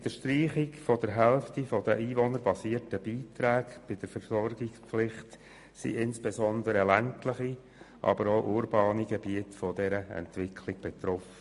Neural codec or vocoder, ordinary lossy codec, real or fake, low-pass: none; MP3, 48 kbps; real; 14.4 kHz